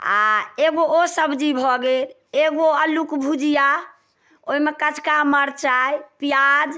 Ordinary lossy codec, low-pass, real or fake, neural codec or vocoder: none; none; real; none